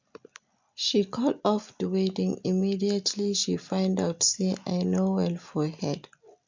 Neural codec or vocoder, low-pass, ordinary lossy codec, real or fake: none; 7.2 kHz; MP3, 64 kbps; real